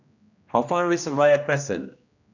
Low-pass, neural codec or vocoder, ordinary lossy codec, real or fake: 7.2 kHz; codec, 16 kHz, 1 kbps, X-Codec, HuBERT features, trained on general audio; none; fake